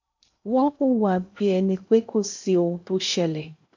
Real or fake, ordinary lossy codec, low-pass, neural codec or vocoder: fake; AAC, 48 kbps; 7.2 kHz; codec, 16 kHz in and 24 kHz out, 0.6 kbps, FocalCodec, streaming, 2048 codes